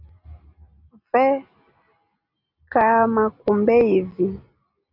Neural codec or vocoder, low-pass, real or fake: none; 5.4 kHz; real